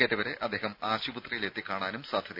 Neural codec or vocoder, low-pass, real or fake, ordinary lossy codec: none; 5.4 kHz; real; none